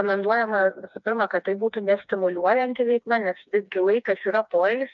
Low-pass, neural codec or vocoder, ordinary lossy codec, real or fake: 7.2 kHz; codec, 16 kHz, 2 kbps, FreqCodec, smaller model; MP3, 64 kbps; fake